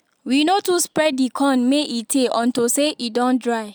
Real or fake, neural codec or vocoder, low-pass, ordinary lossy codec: real; none; none; none